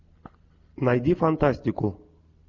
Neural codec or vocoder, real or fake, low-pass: none; real; 7.2 kHz